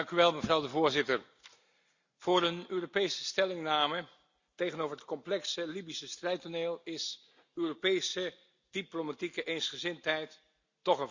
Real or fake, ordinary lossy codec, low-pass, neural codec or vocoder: real; Opus, 64 kbps; 7.2 kHz; none